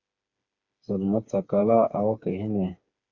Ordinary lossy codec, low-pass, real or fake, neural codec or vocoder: Opus, 64 kbps; 7.2 kHz; fake; codec, 16 kHz, 4 kbps, FreqCodec, smaller model